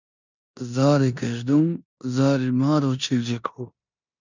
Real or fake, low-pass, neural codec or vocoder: fake; 7.2 kHz; codec, 16 kHz in and 24 kHz out, 0.9 kbps, LongCat-Audio-Codec, four codebook decoder